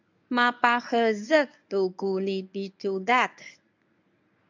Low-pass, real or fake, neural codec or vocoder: 7.2 kHz; fake; codec, 24 kHz, 0.9 kbps, WavTokenizer, medium speech release version 1